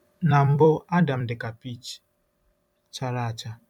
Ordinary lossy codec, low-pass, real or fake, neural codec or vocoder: MP3, 96 kbps; 19.8 kHz; fake; vocoder, 44.1 kHz, 128 mel bands every 256 samples, BigVGAN v2